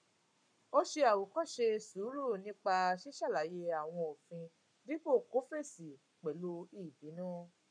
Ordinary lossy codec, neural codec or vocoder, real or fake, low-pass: none; codec, 44.1 kHz, 7.8 kbps, Pupu-Codec; fake; 9.9 kHz